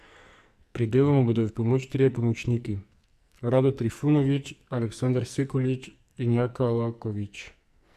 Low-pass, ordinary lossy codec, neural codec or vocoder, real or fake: 14.4 kHz; AAC, 96 kbps; codec, 44.1 kHz, 2.6 kbps, SNAC; fake